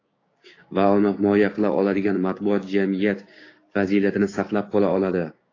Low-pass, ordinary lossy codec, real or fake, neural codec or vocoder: 7.2 kHz; AAC, 32 kbps; fake; codec, 16 kHz, 6 kbps, DAC